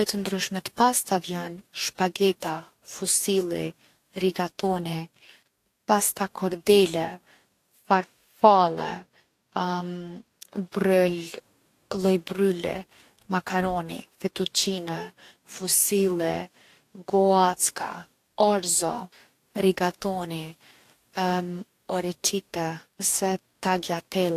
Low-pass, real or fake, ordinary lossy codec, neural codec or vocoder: 14.4 kHz; fake; AAC, 64 kbps; codec, 44.1 kHz, 2.6 kbps, DAC